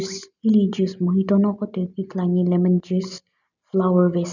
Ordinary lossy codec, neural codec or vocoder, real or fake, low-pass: none; none; real; 7.2 kHz